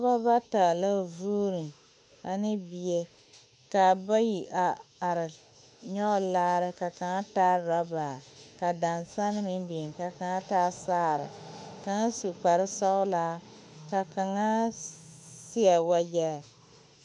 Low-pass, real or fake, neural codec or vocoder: 10.8 kHz; fake; autoencoder, 48 kHz, 32 numbers a frame, DAC-VAE, trained on Japanese speech